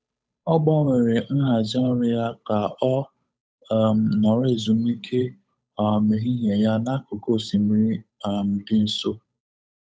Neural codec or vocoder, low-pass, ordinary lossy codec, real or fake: codec, 16 kHz, 8 kbps, FunCodec, trained on Chinese and English, 25 frames a second; none; none; fake